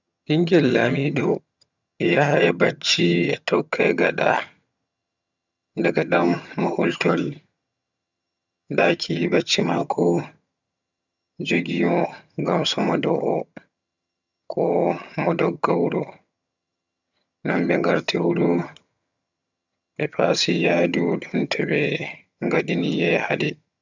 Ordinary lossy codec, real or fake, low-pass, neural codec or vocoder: none; fake; 7.2 kHz; vocoder, 22.05 kHz, 80 mel bands, HiFi-GAN